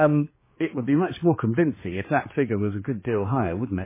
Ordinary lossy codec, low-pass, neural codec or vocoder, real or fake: MP3, 16 kbps; 3.6 kHz; codec, 16 kHz, 2 kbps, X-Codec, HuBERT features, trained on general audio; fake